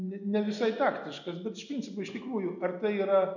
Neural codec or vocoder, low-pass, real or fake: none; 7.2 kHz; real